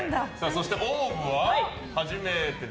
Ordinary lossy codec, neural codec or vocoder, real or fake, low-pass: none; none; real; none